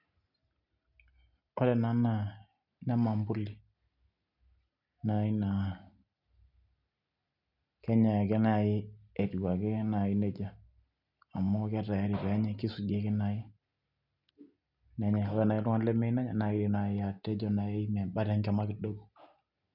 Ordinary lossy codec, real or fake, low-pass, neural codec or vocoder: none; real; 5.4 kHz; none